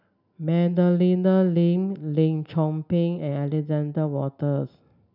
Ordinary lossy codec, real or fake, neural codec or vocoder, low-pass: none; real; none; 5.4 kHz